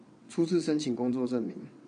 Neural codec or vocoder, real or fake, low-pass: vocoder, 22.05 kHz, 80 mel bands, WaveNeXt; fake; 9.9 kHz